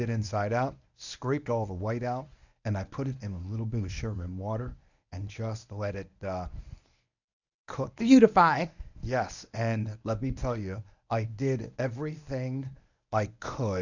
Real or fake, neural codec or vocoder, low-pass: fake; codec, 24 kHz, 0.9 kbps, WavTokenizer, medium speech release version 1; 7.2 kHz